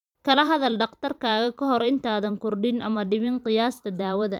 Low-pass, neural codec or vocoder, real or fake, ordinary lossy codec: 19.8 kHz; vocoder, 44.1 kHz, 128 mel bands every 512 samples, BigVGAN v2; fake; none